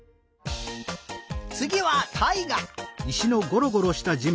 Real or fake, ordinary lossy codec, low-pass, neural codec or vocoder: real; none; none; none